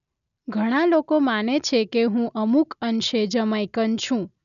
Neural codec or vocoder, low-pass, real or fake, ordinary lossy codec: none; 7.2 kHz; real; none